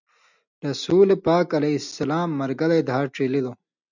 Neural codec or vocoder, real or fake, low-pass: none; real; 7.2 kHz